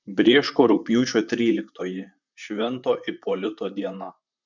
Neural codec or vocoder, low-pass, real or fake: vocoder, 44.1 kHz, 128 mel bands, Pupu-Vocoder; 7.2 kHz; fake